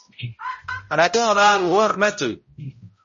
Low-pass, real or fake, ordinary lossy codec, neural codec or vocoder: 7.2 kHz; fake; MP3, 32 kbps; codec, 16 kHz, 0.5 kbps, X-Codec, HuBERT features, trained on balanced general audio